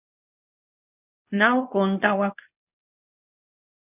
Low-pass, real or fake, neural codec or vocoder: 3.6 kHz; fake; codec, 24 kHz, 0.9 kbps, WavTokenizer, medium speech release version 2